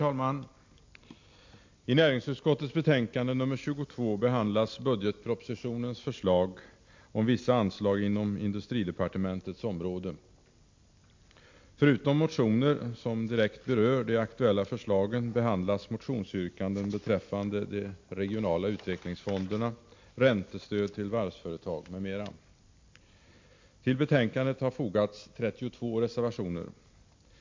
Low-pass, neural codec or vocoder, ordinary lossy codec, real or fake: 7.2 kHz; none; MP3, 48 kbps; real